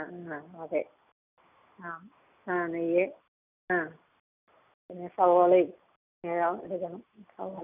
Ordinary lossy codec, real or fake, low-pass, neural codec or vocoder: none; real; 3.6 kHz; none